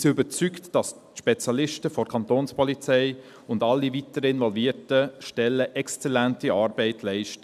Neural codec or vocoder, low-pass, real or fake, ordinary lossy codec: none; 14.4 kHz; real; none